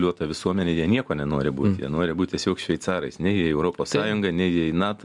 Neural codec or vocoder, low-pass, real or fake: none; 10.8 kHz; real